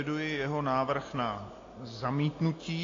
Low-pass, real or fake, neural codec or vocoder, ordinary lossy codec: 7.2 kHz; real; none; AAC, 32 kbps